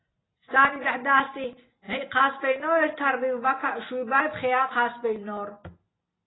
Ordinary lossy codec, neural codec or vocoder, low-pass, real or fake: AAC, 16 kbps; none; 7.2 kHz; real